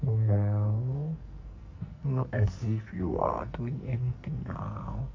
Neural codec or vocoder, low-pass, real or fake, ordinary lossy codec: codec, 44.1 kHz, 2.6 kbps, SNAC; 7.2 kHz; fake; MP3, 48 kbps